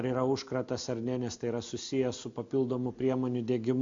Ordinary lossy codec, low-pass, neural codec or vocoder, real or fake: MP3, 48 kbps; 7.2 kHz; none; real